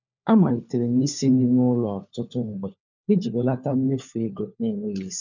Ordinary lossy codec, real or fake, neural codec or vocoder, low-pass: none; fake; codec, 16 kHz, 4 kbps, FunCodec, trained on LibriTTS, 50 frames a second; 7.2 kHz